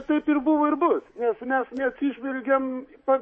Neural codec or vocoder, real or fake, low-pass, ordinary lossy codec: codec, 24 kHz, 3.1 kbps, DualCodec; fake; 10.8 kHz; MP3, 32 kbps